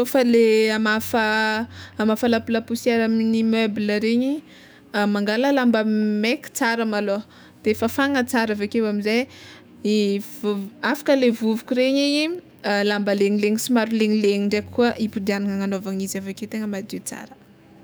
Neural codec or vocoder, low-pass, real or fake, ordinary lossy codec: autoencoder, 48 kHz, 128 numbers a frame, DAC-VAE, trained on Japanese speech; none; fake; none